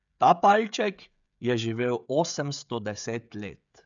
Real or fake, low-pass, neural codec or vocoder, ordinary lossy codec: fake; 7.2 kHz; codec, 16 kHz, 16 kbps, FreqCodec, smaller model; MP3, 96 kbps